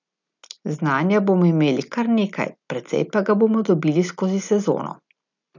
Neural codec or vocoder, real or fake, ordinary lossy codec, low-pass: none; real; none; 7.2 kHz